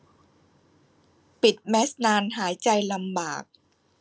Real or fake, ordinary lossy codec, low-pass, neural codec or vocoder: real; none; none; none